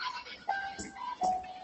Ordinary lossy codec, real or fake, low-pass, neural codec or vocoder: Opus, 16 kbps; real; 7.2 kHz; none